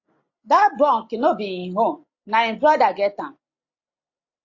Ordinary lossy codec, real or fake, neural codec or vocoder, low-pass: AAC, 48 kbps; real; none; 7.2 kHz